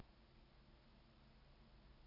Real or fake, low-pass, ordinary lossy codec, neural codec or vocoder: real; 5.4 kHz; none; none